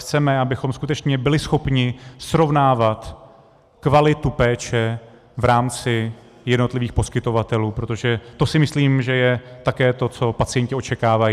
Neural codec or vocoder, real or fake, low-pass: none; real; 14.4 kHz